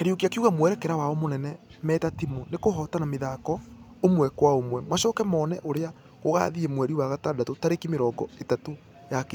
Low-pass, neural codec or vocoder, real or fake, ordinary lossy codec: none; none; real; none